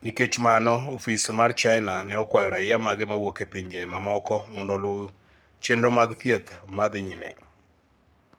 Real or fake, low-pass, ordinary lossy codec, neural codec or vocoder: fake; none; none; codec, 44.1 kHz, 3.4 kbps, Pupu-Codec